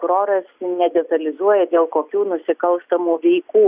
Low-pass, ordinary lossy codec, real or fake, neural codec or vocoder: 3.6 kHz; Opus, 64 kbps; real; none